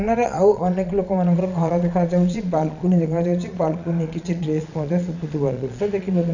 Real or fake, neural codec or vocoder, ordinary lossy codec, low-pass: fake; vocoder, 22.05 kHz, 80 mel bands, Vocos; none; 7.2 kHz